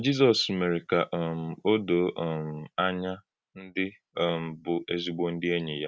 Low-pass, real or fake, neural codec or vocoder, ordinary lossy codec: none; real; none; none